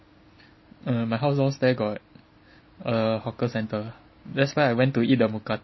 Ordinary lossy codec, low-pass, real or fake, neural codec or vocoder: MP3, 24 kbps; 7.2 kHz; real; none